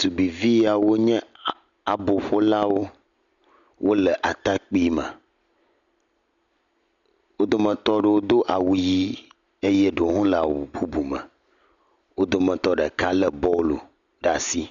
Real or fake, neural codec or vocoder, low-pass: real; none; 7.2 kHz